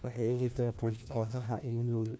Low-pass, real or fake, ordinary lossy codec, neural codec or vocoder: none; fake; none; codec, 16 kHz, 1 kbps, FunCodec, trained on LibriTTS, 50 frames a second